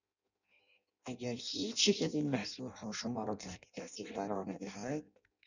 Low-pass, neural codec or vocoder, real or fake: 7.2 kHz; codec, 16 kHz in and 24 kHz out, 0.6 kbps, FireRedTTS-2 codec; fake